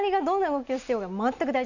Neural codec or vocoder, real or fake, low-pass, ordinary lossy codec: none; real; 7.2 kHz; none